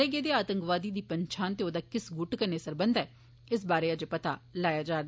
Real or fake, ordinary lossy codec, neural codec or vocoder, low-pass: real; none; none; none